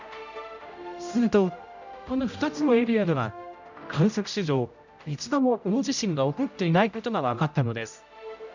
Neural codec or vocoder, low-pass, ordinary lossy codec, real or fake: codec, 16 kHz, 0.5 kbps, X-Codec, HuBERT features, trained on general audio; 7.2 kHz; none; fake